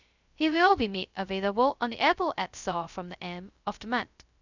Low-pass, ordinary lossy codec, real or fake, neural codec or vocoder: 7.2 kHz; none; fake; codec, 16 kHz, 0.2 kbps, FocalCodec